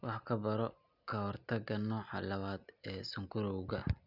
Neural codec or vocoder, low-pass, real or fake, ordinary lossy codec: none; 5.4 kHz; real; none